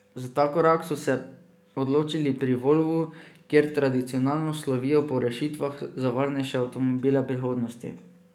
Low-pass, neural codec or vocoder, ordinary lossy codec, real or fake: 19.8 kHz; codec, 44.1 kHz, 7.8 kbps, DAC; none; fake